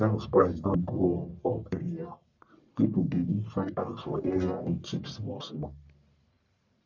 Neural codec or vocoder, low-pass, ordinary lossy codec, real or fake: codec, 44.1 kHz, 1.7 kbps, Pupu-Codec; 7.2 kHz; none; fake